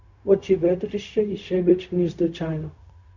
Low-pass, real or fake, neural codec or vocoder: 7.2 kHz; fake; codec, 16 kHz, 0.4 kbps, LongCat-Audio-Codec